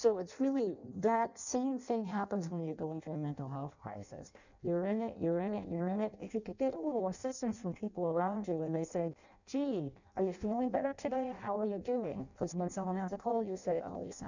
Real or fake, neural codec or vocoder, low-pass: fake; codec, 16 kHz in and 24 kHz out, 0.6 kbps, FireRedTTS-2 codec; 7.2 kHz